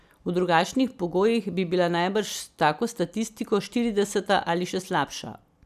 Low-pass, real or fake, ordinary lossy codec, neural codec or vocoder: 14.4 kHz; real; none; none